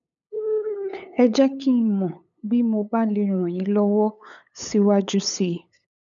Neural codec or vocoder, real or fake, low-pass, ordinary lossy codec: codec, 16 kHz, 8 kbps, FunCodec, trained on LibriTTS, 25 frames a second; fake; 7.2 kHz; none